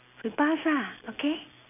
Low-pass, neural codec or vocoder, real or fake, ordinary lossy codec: 3.6 kHz; none; real; none